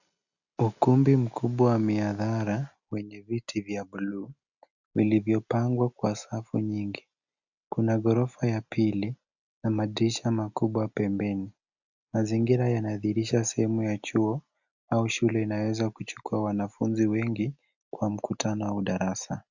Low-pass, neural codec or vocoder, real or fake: 7.2 kHz; none; real